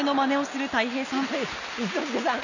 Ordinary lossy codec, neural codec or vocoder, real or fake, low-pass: none; none; real; 7.2 kHz